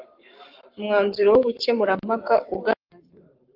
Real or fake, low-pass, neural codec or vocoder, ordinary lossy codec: fake; 5.4 kHz; codec, 44.1 kHz, 7.8 kbps, Pupu-Codec; Opus, 32 kbps